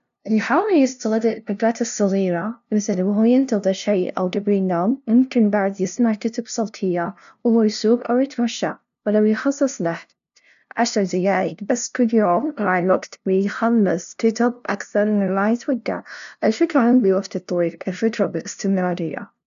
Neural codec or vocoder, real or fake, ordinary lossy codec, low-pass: codec, 16 kHz, 0.5 kbps, FunCodec, trained on LibriTTS, 25 frames a second; fake; none; 7.2 kHz